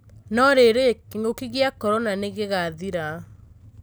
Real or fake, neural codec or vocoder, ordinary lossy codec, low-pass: real; none; none; none